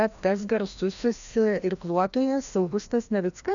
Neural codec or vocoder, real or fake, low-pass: codec, 16 kHz, 1 kbps, FreqCodec, larger model; fake; 7.2 kHz